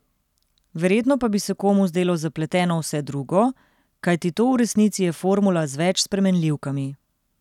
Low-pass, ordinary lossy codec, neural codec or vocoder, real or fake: 19.8 kHz; none; none; real